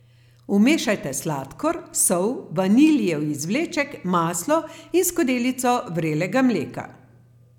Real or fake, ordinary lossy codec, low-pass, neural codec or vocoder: real; none; 19.8 kHz; none